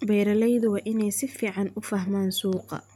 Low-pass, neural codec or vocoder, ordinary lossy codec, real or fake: 19.8 kHz; none; none; real